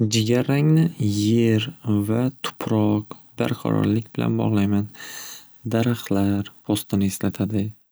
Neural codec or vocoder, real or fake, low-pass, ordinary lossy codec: none; real; none; none